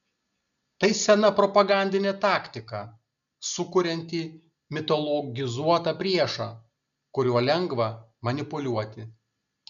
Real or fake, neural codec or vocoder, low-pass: real; none; 7.2 kHz